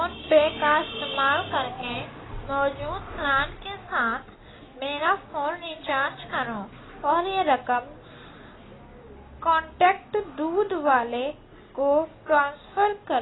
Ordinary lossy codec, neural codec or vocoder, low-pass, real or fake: AAC, 16 kbps; none; 7.2 kHz; real